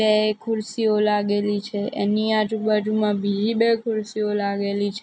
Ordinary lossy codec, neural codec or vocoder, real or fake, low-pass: none; none; real; none